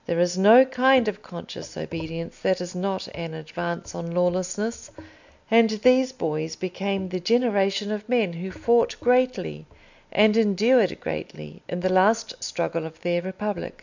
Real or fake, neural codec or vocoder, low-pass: real; none; 7.2 kHz